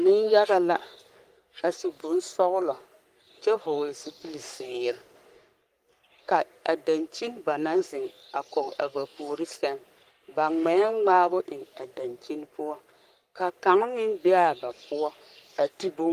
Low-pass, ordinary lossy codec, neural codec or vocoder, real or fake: 14.4 kHz; Opus, 32 kbps; codec, 44.1 kHz, 3.4 kbps, Pupu-Codec; fake